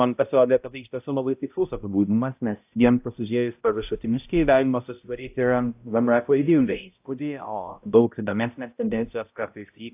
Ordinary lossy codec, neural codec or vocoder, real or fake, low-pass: AAC, 32 kbps; codec, 16 kHz, 0.5 kbps, X-Codec, HuBERT features, trained on balanced general audio; fake; 3.6 kHz